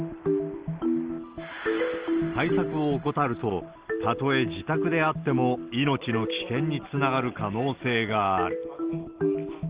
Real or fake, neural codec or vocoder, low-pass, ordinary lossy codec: real; none; 3.6 kHz; Opus, 16 kbps